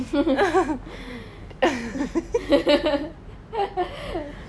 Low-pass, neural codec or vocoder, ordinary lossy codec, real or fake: none; none; none; real